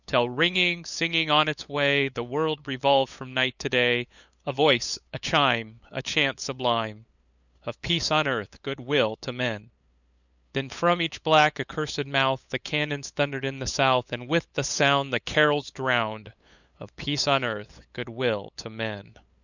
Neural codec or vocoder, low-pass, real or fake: codec, 16 kHz, 8 kbps, FunCodec, trained on LibriTTS, 25 frames a second; 7.2 kHz; fake